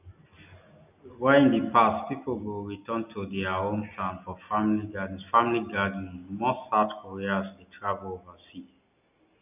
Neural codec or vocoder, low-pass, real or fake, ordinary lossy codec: none; 3.6 kHz; real; none